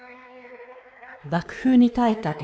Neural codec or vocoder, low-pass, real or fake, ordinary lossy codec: codec, 16 kHz, 2 kbps, X-Codec, WavLM features, trained on Multilingual LibriSpeech; none; fake; none